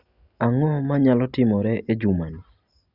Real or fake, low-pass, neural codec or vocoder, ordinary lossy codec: real; 5.4 kHz; none; Opus, 64 kbps